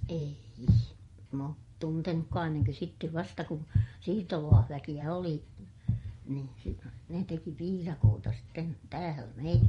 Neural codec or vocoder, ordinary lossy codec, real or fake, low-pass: vocoder, 24 kHz, 100 mel bands, Vocos; MP3, 48 kbps; fake; 10.8 kHz